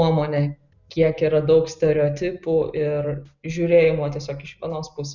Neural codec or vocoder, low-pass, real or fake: none; 7.2 kHz; real